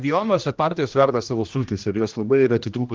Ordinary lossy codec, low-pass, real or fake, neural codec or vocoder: Opus, 24 kbps; 7.2 kHz; fake; codec, 16 kHz, 1 kbps, X-Codec, HuBERT features, trained on general audio